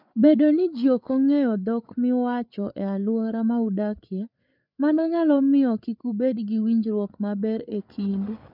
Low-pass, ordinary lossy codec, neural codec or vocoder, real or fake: 5.4 kHz; none; codec, 16 kHz, 8 kbps, FreqCodec, larger model; fake